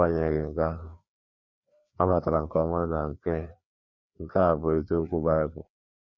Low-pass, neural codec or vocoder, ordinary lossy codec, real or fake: 7.2 kHz; codec, 16 kHz, 2 kbps, FreqCodec, larger model; none; fake